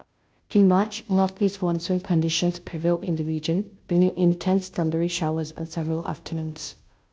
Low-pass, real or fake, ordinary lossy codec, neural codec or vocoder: none; fake; none; codec, 16 kHz, 0.5 kbps, FunCodec, trained on Chinese and English, 25 frames a second